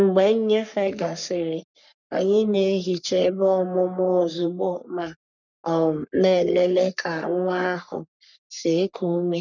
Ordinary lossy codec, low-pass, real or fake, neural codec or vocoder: none; 7.2 kHz; fake; codec, 44.1 kHz, 3.4 kbps, Pupu-Codec